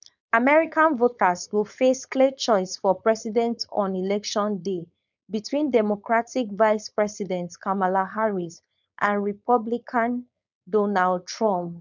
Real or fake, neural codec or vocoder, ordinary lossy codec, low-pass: fake; codec, 16 kHz, 4.8 kbps, FACodec; none; 7.2 kHz